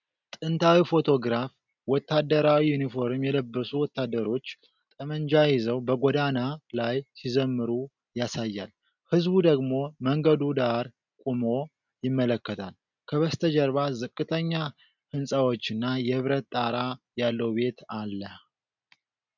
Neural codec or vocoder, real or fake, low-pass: none; real; 7.2 kHz